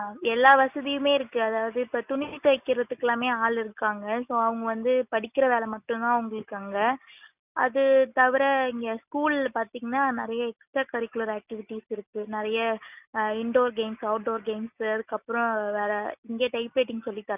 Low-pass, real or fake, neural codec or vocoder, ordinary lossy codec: 3.6 kHz; real; none; none